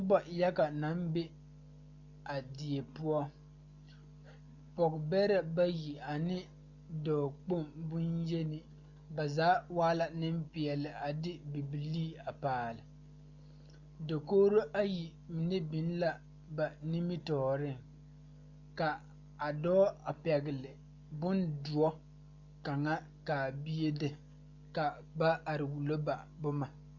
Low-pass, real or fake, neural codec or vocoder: 7.2 kHz; real; none